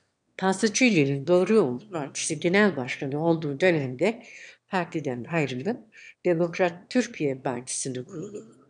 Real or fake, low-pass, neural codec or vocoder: fake; 9.9 kHz; autoencoder, 22.05 kHz, a latent of 192 numbers a frame, VITS, trained on one speaker